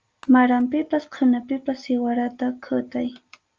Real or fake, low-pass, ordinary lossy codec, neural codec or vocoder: real; 7.2 kHz; Opus, 24 kbps; none